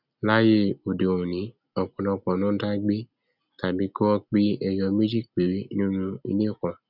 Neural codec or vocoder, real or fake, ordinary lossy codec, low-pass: none; real; none; 5.4 kHz